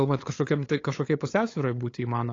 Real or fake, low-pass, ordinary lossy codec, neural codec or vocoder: fake; 7.2 kHz; AAC, 32 kbps; codec, 16 kHz, 8 kbps, FunCodec, trained on LibriTTS, 25 frames a second